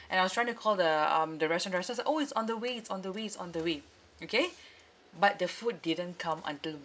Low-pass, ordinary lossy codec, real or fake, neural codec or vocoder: none; none; real; none